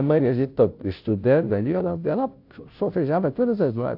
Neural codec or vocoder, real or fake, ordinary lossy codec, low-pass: codec, 16 kHz, 0.5 kbps, FunCodec, trained on Chinese and English, 25 frames a second; fake; AAC, 48 kbps; 5.4 kHz